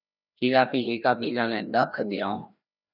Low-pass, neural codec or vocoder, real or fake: 5.4 kHz; codec, 16 kHz, 1 kbps, FreqCodec, larger model; fake